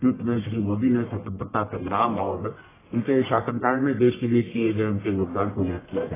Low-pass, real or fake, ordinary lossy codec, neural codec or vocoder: 3.6 kHz; fake; AAC, 16 kbps; codec, 44.1 kHz, 1.7 kbps, Pupu-Codec